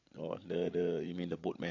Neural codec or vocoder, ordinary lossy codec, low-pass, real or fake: codec, 16 kHz, 8 kbps, FreqCodec, larger model; AAC, 48 kbps; 7.2 kHz; fake